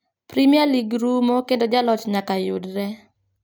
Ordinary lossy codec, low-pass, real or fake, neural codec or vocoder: none; none; real; none